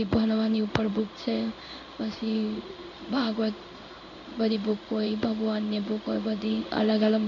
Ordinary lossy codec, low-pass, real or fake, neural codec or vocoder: none; 7.2 kHz; fake; codec, 16 kHz in and 24 kHz out, 1 kbps, XY-Tokenizer